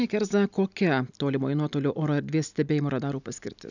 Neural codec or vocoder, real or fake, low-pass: none; real; 7.2 kHz